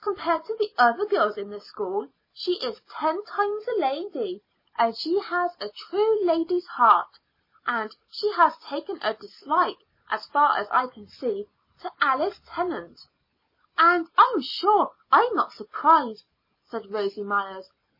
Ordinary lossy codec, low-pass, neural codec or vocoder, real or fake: MP3, 24 kbps; 5.4 kHz; none; real